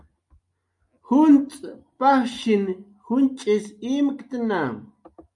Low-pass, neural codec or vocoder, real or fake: 10.8 kHz; none; real